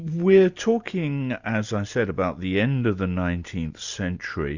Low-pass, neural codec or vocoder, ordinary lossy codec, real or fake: 7.2 kHz; none; Opus, 64 kbps; real